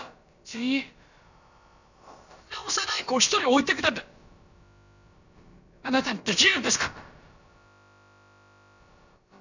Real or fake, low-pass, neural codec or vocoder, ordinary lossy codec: fake; 7.2 kHz; codec, 16 kHz, about 1 kbps, DyCAST, with the encoder's durations; none